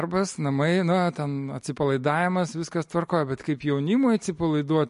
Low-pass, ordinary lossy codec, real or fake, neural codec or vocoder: 14.4 kHz; MP3, 48 kbps; real; none